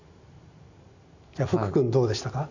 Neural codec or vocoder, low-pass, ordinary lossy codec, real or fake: none; 7.2 kHz; none; real